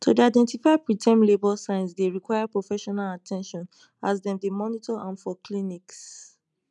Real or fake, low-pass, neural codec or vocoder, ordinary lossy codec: real; 10.8 kHz; none; none